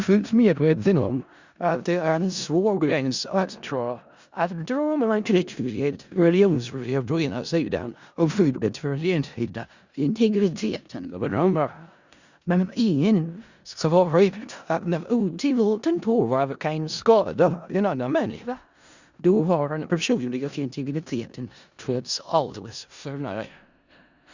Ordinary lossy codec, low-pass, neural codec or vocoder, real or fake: Opus, 64 kbps; 7.2 kHz; codec, 16 kHz in and 24 kHz out, 0.4 kbps, LongCat-Audio-Codec, four codebook decoder; fake